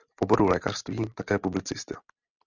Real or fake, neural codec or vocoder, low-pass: real; none; 7.2 kHz